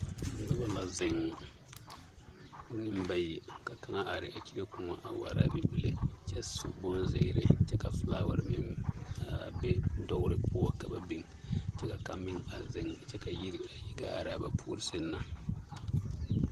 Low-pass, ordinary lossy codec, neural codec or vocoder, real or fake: 14.4 kHz; Opus, 24 kbps; vocoder, 44.1 kHz, 128 mel bands, Pupu-Vocoder; fake